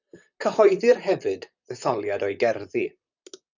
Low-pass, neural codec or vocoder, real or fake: 7.2 kHz; vocoder, 44.1 kHz, 128 mel bands, Pupu-Vocoder; fake